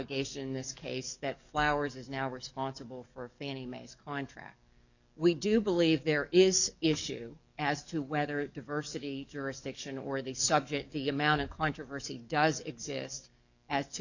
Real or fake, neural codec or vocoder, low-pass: fake; codec, 44.1 kHz, 7.8 kbps, DAC; 7.2 kHz